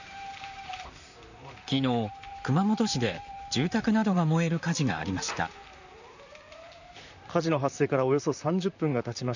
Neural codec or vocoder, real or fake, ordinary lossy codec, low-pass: vocoder, 44.1 kHz, 128 mel bands, Pupu-Vocoder; fake; none; 7.2 kHz